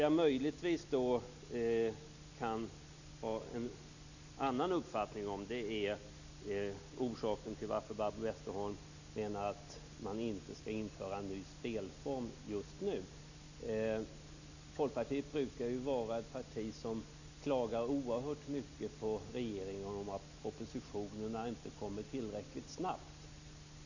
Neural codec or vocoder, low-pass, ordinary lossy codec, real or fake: none; 7.2 kHz; none; real